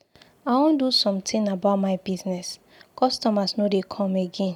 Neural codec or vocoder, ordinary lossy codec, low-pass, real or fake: none; none; 19.8 kHz; real